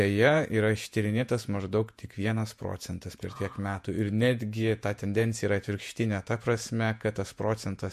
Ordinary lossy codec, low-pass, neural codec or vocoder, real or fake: MP3, 64 kbps; 14.4 kHz; vocoder, 48 kHz, 128 mel bands, Vocos; fake